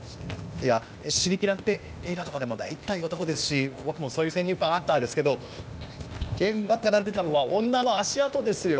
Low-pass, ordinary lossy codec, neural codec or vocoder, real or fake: none; none; codec, 16 kHz, 0.8 kbps, ZipCodec; fake